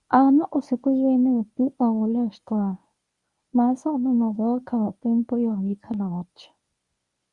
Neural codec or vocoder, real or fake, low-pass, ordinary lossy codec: codec, 24 kHz, 0.9 kbps, WavTokenizer, medium speech release version 1; fake; 10.8 kHz; Opus, 64 kbps